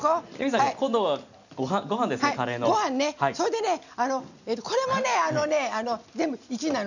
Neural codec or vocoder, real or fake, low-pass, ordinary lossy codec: none; real; 7.2 kHz; none